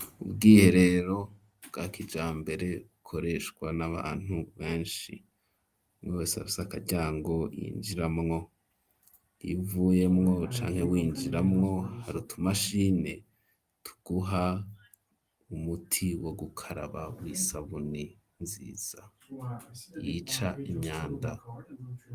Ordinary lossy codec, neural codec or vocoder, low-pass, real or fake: Opus, 32 kbps; none; 14.4 kHz; real